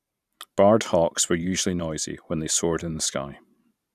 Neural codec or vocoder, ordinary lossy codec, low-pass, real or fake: vocoder, 44.1 kHz, 128 mel bands every 512 samples, BigVGAN v2; none; 14.4 kHz; fake